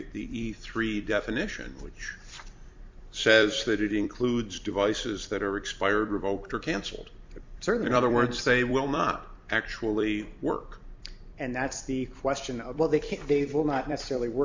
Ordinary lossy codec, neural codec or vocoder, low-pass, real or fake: MP3, 64 kbps; vocoder, 44.1 kHz, 128 mel bands every 256 samples, BigVGAN v2; 7.2 kHz; fake